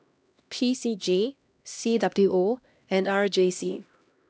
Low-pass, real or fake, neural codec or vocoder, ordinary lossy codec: none; fake; codec, 16 kHz, 1 kbps, X-Codec, HuBERT features, trained on LibriSpeech; none